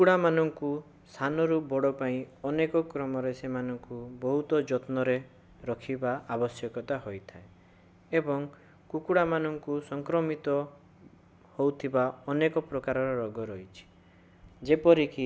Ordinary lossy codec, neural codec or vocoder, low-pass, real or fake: none; none; none; real